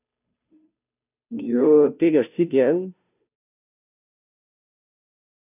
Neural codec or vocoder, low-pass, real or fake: codec, 16 kHz, 0.5 kbps, FunCodec, trained on Chinese and English, 25 frames a second; 3.6 kHz; fake